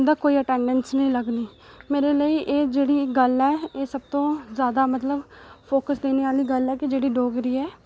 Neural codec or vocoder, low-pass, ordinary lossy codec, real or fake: none; none; none; real